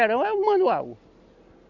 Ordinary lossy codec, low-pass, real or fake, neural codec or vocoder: Opus, 64 kbps; 7.2 kHz; real; none